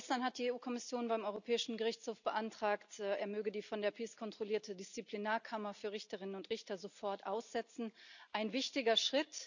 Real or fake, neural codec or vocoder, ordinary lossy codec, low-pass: real; none; none; 7.2 kHz